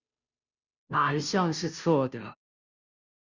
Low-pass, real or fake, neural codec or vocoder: 7.2 kHz; fake; codec, 16 kHz, 0.5 kbps, FunCodec, trained on Chinese and English, 25 frames a second